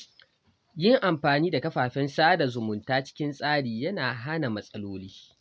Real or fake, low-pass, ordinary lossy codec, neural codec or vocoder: real; none; none; none